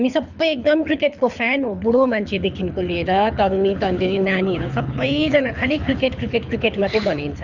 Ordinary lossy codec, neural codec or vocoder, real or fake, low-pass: none; codec, 24 kHz, 6 kbps, HILCodec; fake; 7.2 kHz